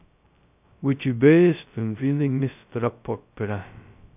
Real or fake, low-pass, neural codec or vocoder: fake; 3.6 kHz; codec, 16 kHz, 0.2 kbps, FocalCodec